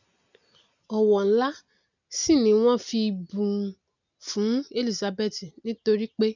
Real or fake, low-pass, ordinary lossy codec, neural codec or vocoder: real; 7.2 kHz; none; none